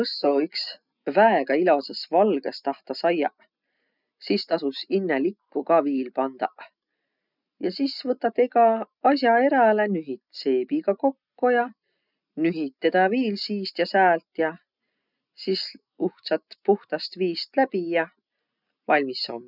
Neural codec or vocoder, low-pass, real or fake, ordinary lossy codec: none; 5.4 kHz; real; none